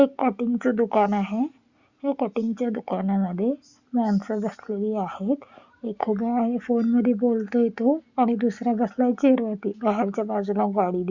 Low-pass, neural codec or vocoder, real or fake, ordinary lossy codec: 7.2 kHz; none; real; Opus, 64 kbps